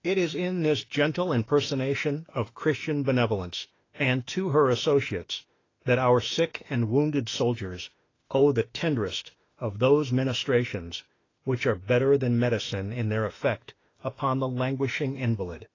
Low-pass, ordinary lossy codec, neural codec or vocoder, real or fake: 7.2 kHz; AAC, 32 kbps; autoencoder, 48 kHz, 32 numbers a frame, DAC-VAE, trained on Japanese speech; fake